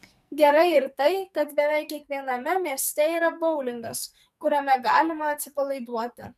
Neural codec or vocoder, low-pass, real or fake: codec, 44.1 kHz, 2.6 kbps, SNAC; 14.4 kHz; fake